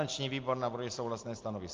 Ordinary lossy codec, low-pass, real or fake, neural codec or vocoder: Opus, 32 kbps; 7.2 kHz; real; none